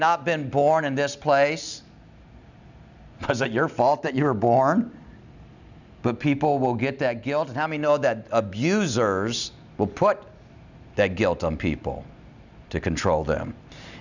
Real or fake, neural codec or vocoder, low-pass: real; none; 7.2 kHz